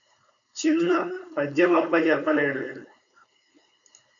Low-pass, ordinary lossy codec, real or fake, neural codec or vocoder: 7.2 kHz; MP3, 96 kbps; fake; codec, 16 kHz, 4.8 kbps, FACodec